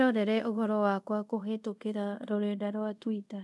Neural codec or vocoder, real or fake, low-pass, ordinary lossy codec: codec, 24 kHz, 0.5 kbps, DualCodec; fake; none; none